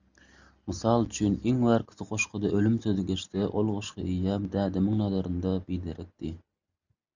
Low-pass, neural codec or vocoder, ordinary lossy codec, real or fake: 7.2 kHz; none; Opus, 64 kbps; real